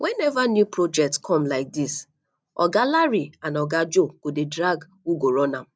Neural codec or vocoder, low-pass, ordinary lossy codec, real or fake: none; none; none; real